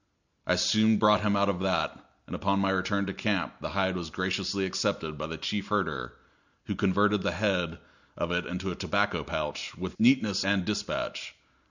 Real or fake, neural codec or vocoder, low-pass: real; none; 7.2 kHz